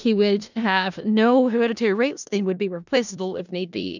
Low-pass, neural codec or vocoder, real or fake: 7.2 kHz; codec, 16 kHz in and 24 kHz out, 0.4 kbps, LongCat-Audio-Codec, four codebook decoder; fake